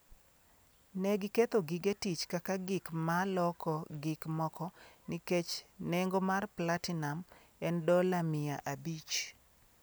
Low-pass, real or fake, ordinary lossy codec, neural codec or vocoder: none; real; none; none